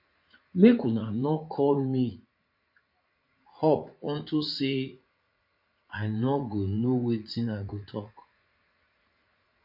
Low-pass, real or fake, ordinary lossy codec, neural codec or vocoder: 5.4 kHz; fake; MP3, 32 kbps; vocoder, 44.1 kHz, 80 mel bands, Vocos